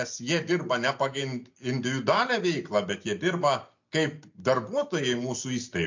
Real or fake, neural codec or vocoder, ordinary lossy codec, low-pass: real; none; MP3, 48 kbps; 7.2 kHz